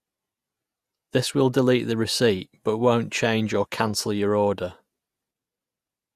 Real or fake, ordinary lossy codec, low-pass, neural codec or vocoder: fake; Opus, 64 kbps; 14.4 kHz; vocoder, 48 kHz, 128 mel bands, Vocos